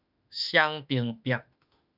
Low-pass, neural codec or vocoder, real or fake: 5.4 kHz; autoencoder, 48 kHz, 32 numbers a frame, DAC-VAE, trained on Japanese speech; fake